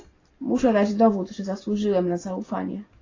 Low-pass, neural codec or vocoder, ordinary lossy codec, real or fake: 7.2 kHz; none; AAC, 32 kbps; real